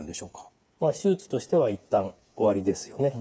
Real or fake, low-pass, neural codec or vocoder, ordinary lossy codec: fake; none; codec, 16 kHz, 8 kbps, FreqCodec, smaller model; none